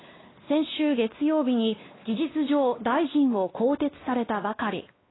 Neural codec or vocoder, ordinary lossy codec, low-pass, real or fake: codec, 16 kHz, 2 kbps, X-Codec, WavLM features, trained on Multilingual LibriSpeech; AAC, 16 kbps; 7.2 kHz; fake